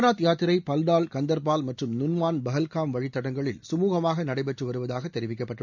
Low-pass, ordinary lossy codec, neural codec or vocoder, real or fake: 7.2 kHz; none; none; real